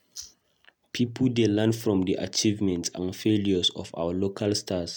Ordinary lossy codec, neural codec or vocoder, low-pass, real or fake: none; none; 19.8 kHz; real